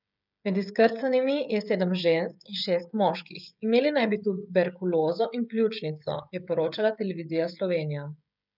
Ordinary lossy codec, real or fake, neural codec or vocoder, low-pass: none; fake; codec, 16 kHz, 16 kbps, FreqCodec, smaller model; 5.4 kHz